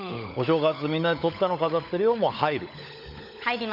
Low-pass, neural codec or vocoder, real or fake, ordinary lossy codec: 5.4 kHz; codec, 16 kHz, 16 kbps, FunCodec, trained on LibriTTS, 50 frames a second; fake; none